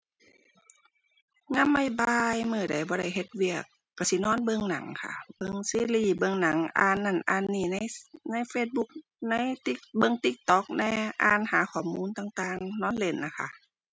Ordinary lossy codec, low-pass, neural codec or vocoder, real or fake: none; none; none; real